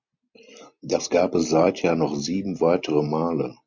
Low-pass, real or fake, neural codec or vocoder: 7.2 kHz; real; none